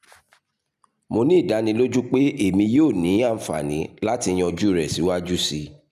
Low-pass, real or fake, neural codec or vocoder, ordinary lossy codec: 14.4 kHz; real; none; none